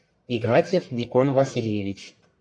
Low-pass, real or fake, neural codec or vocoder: 9.9 kHz; fake; codec, 44.1 kHz, 1.7 kbps, Pupu-Codec